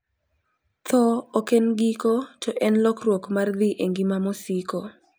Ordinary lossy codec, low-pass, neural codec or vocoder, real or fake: none; none; none; real